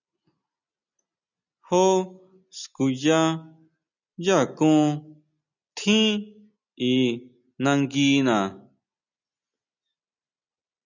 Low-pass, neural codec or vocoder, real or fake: 7.2 kHz; none; real